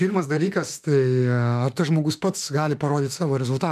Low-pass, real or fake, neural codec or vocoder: 14.4 kHz; fake; autoencoder, 48 kHz, 32 numbers a frame, DAC-VAE, trained on Japanese speech